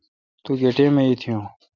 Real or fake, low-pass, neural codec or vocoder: real; 7.2 kHz; none